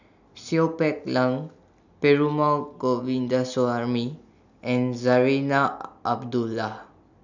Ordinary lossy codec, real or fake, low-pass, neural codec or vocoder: none; real; 7.2 kHz; none